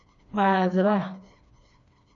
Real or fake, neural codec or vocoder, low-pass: fake; codec, 16 kHz, 2 kbps, FreqCodec, smaller model; 7.2 kHz